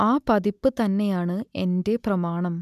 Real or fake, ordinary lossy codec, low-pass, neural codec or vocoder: real; none; 14.4 kHz; none